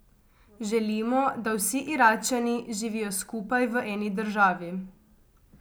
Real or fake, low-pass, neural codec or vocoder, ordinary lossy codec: real; none; none; none